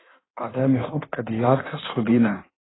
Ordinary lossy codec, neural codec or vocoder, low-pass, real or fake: AAC, 16 kbps; codec, 16 kHz in and 24 kHz out, 1.1 kbps, FireRedTTS-2 codec; 7.2 kHz; fake